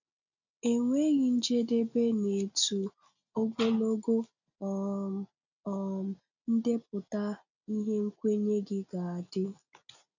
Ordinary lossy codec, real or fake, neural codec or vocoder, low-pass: none; real; none; 7.2 kHz